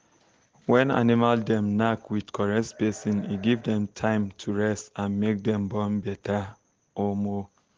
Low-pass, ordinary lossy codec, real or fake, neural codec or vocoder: 7.2 kHz; Opus, 16 kbps; real; none